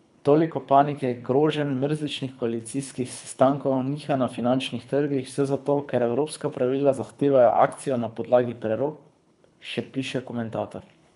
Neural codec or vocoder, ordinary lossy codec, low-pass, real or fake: codec, 24 kHz, 3 kbps, HILCodec; none; 10.8 kHz; fake